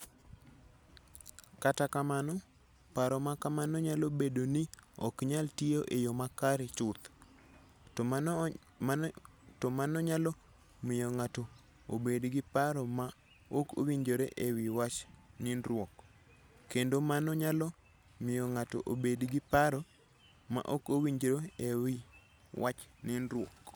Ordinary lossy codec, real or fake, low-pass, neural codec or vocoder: none; real; none; none